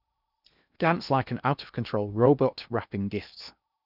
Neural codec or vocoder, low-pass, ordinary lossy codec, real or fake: codec, 16 kHz in and 24 kHz out, 0.8 kbps, FocalCodec, streaming, 65536 codes; 5.4 kHz; none; fake